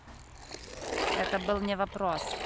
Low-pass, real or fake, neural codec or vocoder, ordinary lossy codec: none; real; none; none